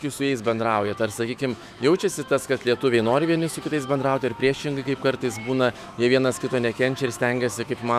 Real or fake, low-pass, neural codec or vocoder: fake; 14.4 kHz; autoencoder, 48 kHz, 128 numbers a frame, DAC-VAE, trained on Japanese speech